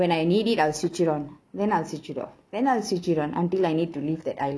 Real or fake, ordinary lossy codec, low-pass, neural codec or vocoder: real; none; none; none